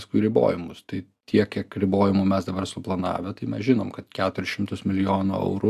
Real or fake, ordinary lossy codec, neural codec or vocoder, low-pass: real; AAC, 96 kbps; none; 14.4 kHz